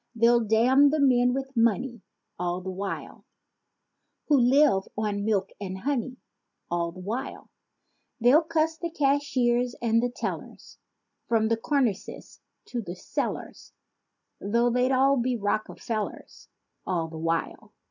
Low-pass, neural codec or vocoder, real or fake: 7.2 kHz; none; real